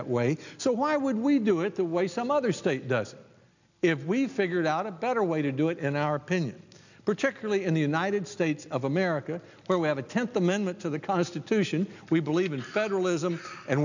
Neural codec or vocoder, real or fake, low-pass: none; real; 7.2 kHz